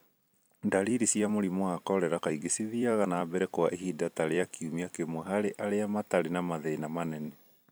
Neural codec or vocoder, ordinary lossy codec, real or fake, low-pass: vocoder, 44.1 kHz, 128 mel bands every 256 samples, BigVGAN v2; none; fake; none